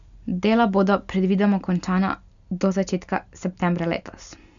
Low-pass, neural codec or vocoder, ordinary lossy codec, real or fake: 7.2 kHz; none; none; real